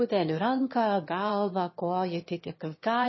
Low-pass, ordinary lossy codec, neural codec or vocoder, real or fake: 7.2 kHz; MP3, 24 kbps; autoencoder, 22.05 kHz, a latent of 192 numbers a frame, VITS, trained on one speaker; fake